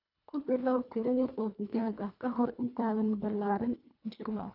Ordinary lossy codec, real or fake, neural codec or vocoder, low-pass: none; fake; codec, 24 kHz, 1.5 kbps, HILCodec; 5.4 kHz